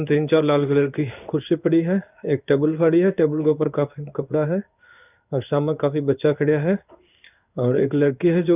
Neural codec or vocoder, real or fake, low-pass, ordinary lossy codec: codec, 16 kHz in and 24 kHz out, 1 kbps, XY-Tokenizer; fake; 3.6 kHz; none